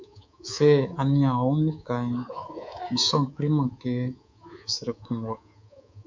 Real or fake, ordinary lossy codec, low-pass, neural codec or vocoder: fake; MP3, 64 kbps; 7.2 kHz; codec, 24 kHz, 3.1 kbps, DualCodec